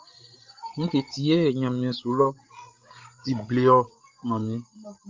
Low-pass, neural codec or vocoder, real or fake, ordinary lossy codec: 7.2 kHz; codec, 16 kHz, 16 kbps, FreqCodec, larger model; fake; Opus, 24 kbps